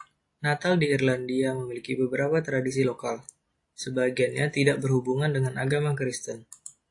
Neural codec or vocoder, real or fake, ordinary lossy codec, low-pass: none; real; AAC, 48 kbps; 10.8 kHz